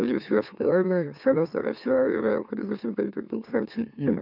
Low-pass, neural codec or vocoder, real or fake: 5.4 kHz; autoencoder, 44.1 kHz, a latent of 192 numbers a frame, MeloTTS; fake